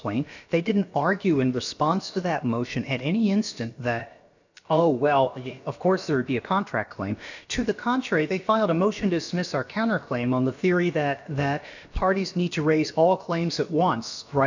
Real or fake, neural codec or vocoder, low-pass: fake; codec, 16 kHz, about 1 kbps, DyCAST, with the encoder's durations; 7.2 kHz